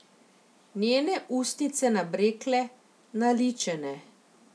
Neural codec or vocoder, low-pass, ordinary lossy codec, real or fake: none; none; none; real